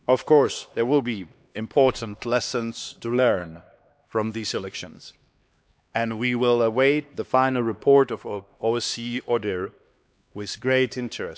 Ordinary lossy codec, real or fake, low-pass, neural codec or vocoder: none; fake; none; codec, 16 kHz, 2 kbps, X-Codec, HuBERT features, trained on LibriSpeech